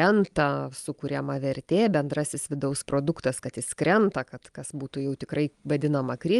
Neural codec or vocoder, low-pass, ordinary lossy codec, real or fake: none; 10.8 kHz; Opus, 32 kbps; real